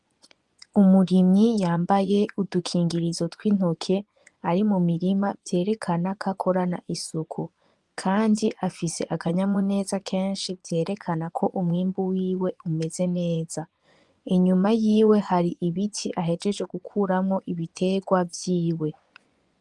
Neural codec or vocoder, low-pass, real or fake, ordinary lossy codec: vocoder, 24 kHz, 100 mel bands, Vocos; 10.8 kHz; fake; Opus, 32 kbps